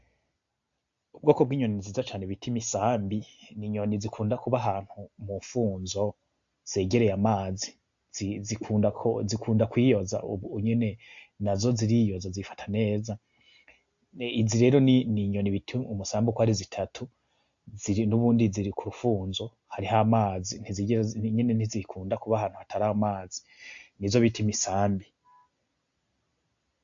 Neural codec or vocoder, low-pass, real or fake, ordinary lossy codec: none; 7.2 kHz; real; AAC, 64 kbps